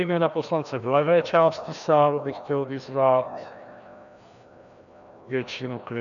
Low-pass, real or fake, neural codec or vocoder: 7.2 kHz; fake; codec, 16 kHz, 1 kbps, FreqCodec, larger model